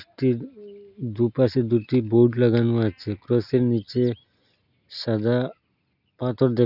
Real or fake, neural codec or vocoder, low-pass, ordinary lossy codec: real; none; 5.4 kHz; none